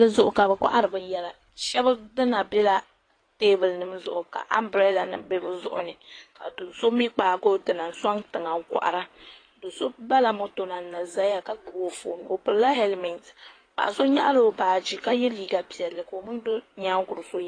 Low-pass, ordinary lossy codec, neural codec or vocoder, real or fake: 9.9 kHz; AAC, 32 kbps; codec, 16 kHz in and 24 kHz out, 2.2 kbps, FireRedTTS-2 codec; fake